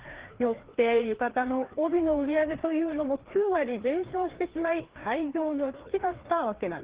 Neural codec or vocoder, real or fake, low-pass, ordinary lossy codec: codec, 16 kHz, 2 kbps, FreqCodec, larger model; fake; 3.6 kHz; Opus, 16 kbps